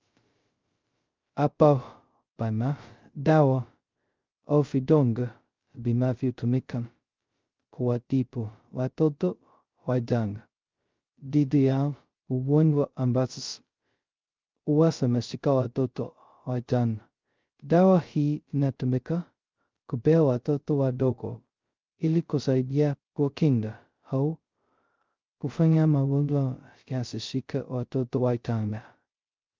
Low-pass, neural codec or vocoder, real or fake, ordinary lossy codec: 7.2 kHz; codec, 16 kHz, 0.2 kbps, FocalCodec; fake; Opus, 24 kbps